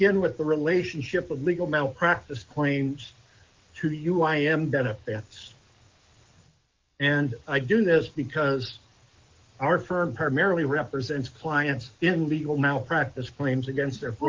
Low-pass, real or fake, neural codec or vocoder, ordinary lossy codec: 7.2 kHz; fake; codec, 44.1 kHz, 7.8 kbps, DAC; Opus, 32 kbps